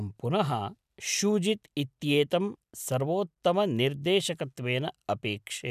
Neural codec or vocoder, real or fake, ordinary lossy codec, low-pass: none; real; none; 14.4 kHz